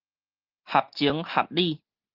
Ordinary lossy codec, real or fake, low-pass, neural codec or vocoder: Opus, 32 kbps; real; 5.4 kHz; none